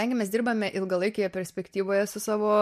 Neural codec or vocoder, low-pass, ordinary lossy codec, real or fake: none; 14.4 kHz; MP3, 64 kbps; real